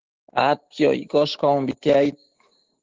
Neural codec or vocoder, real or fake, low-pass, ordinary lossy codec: codec, 16 kHz, 6 kbps, DAC; fake; 7.2 kHz; Opus, 16 kbps